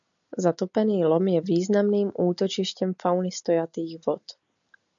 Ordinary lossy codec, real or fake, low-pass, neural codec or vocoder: MP3, 96 kbps; real; 7.2 kHz; none